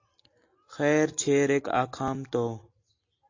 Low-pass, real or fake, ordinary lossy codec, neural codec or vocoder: 7.2 kHz; real; AAC, 48 kbps; none